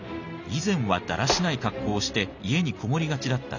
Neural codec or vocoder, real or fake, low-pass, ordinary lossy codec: none; real; 7.2 kHz; none